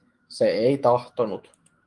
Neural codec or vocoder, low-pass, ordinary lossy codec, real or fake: autoencoder, 48 kHz, 128 numbers a frame, DAC-VAE, trained on Japanese speech; 10.8 kHz; Opus, 24 kbps; fake